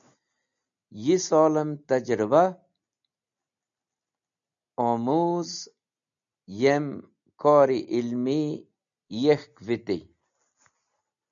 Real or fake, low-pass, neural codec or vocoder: real; 7.2 kHz; none